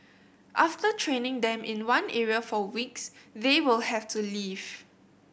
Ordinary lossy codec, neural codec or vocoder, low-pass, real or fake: none; none; none; real